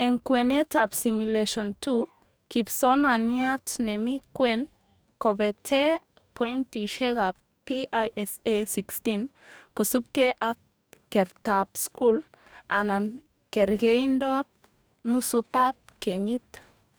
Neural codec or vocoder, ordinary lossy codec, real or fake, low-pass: codec, 44.1 kHz, 2.6 kbps, DAC; none; fake; none